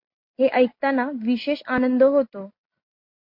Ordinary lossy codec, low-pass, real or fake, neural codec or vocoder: MP3, 32 kbps; 5.4 kHz; real; none